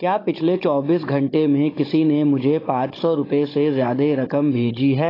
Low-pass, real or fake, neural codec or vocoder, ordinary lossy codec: 5.4 kHz; fake; codec, 16 kHz, 16 kbps, FunCodec, trained on Chinese and English, 50 frames a second; AAC, 24 kbps